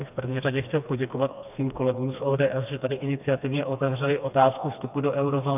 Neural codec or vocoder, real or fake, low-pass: codec, 16 kHz, 2 kbps, FreqCodec, smaller model; fake; 3.6 kHz